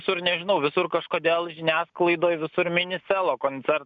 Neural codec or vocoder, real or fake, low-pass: none; real; 7.2 kHz